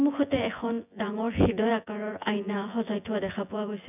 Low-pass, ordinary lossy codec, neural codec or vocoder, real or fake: 3.6 kHz; none; vocoder, 24 kHz, 100 mel bands, Vocos; fake